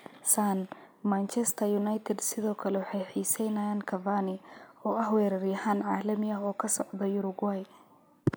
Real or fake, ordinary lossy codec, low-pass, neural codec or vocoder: real; none; none; none